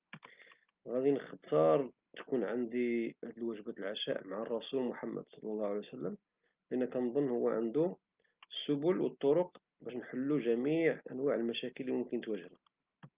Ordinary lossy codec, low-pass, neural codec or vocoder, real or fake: Opus, 24 kbps; 3.6 kHz; none; real